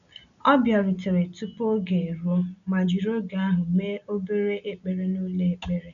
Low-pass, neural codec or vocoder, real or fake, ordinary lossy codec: 7.2 kHz; none; real; none